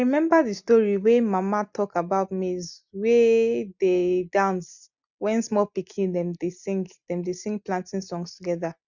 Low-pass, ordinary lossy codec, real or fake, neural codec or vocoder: 7.2 kHz; none; real; none